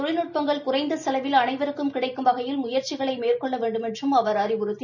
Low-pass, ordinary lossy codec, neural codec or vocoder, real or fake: 7.2 kHz; none; none; real